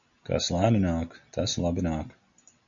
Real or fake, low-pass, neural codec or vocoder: real; 7.2 kHz; none